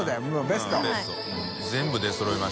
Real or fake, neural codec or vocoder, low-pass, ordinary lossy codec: real; none; none; none